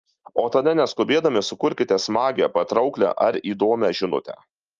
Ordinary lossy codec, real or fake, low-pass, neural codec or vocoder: Opus, 24 kbps; real; 7.2 kHz; none